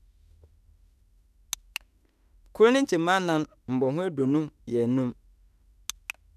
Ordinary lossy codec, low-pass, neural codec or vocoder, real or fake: none; 14.4 kHz; autoencoder, 48 kHz, 32 numbers a frame, DAC-VAE, trained on Japanese speech; fake